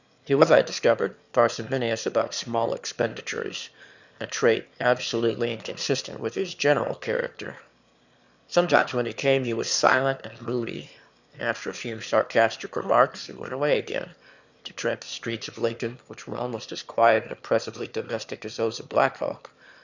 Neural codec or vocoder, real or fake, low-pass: autoencoder, 22.05 kHz, a latent of 192 numbers a frame, VITS, trained on one speaker; fake; 7.2 kHz